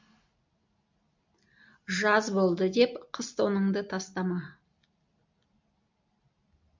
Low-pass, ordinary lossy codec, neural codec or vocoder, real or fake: 7.2 kHz; MP3, 64 kbps; none; real